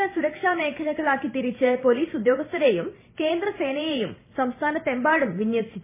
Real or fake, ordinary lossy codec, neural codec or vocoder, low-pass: fake; MP3, 16 kbps; vocoder, 44.1 kHz, 128 mel bands every 512 samples, BigVGAN v2; 3.6 kHz